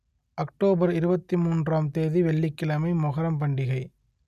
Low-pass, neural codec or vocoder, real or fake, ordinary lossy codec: 14.4 kHz; none; real; none